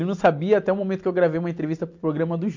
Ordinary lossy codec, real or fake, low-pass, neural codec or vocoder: MP3, 64 kbps; real; 7.2 kHz; none